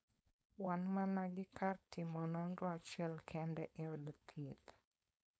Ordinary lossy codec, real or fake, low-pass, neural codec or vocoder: none; fake; none; codec, 16 kHz, 4.8 kbps, FACodec